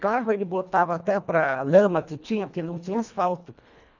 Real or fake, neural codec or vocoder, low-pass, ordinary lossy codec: fake; codec, 24 kHz, 1.5 kbps, HILCodec; 7.2 kHz; none